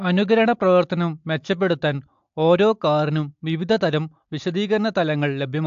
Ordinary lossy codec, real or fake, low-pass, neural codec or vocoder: AAC, 64 kbps; real; 7.2 kHz; none